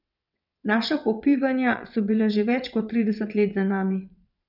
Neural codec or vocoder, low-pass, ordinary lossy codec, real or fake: vocoder, 22.05 kHz, 80 mel bands, WaveNeXt; 5.4 kHz; none; fake